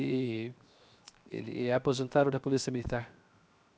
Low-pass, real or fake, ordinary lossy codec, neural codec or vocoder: none; fake; none; codec, 16 kHz, 0.7 kbps, FocalCodec